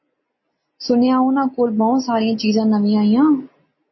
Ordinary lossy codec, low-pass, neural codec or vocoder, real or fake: MP3, 24 kbps; 7.2 kHz; none; real